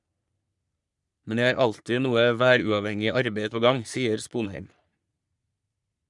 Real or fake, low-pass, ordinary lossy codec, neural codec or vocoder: fake; 10.8 kHz; none; codec, 44.1 kHz, 3.4 kbps, Pupu-Codec